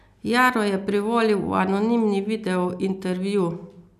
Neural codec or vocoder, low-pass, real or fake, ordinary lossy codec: none; 14.4 kHz; real; none